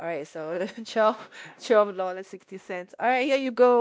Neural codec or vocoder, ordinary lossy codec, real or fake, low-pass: codec, 16 kHz, 1 kbps, X-Codec, WavLM features, trained on Multilingual LibriSpeech; none; fake; none